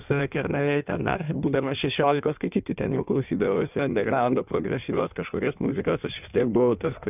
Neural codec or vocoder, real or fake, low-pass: codec, 16 kHz in and 24 kHz out, 1.1 kbps, FireRedTTS-2 codec; fake; 3.6 kHz